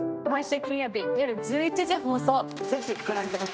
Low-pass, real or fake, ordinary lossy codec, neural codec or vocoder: none; fake; none; codec, 16 kHz, 1 kbps, X-Codec, HuBERT features, trained on general audio